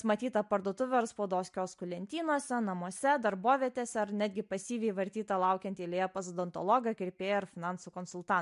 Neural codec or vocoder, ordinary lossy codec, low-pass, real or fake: none; MP3, 64 kbps; 10.8 kHz; real